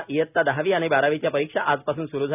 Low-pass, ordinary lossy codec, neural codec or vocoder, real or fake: 3.6 kHz; none; none; real